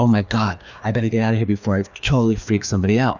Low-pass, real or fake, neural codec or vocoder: 7.2 kHz; fake; codec, 16 kHz, 2 kbps, FreqCodec, larger model